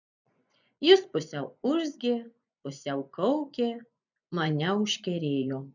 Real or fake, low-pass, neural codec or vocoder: fake; 7.2 kHz; vocoder, 24 kHz, 100 mel bands, Vocos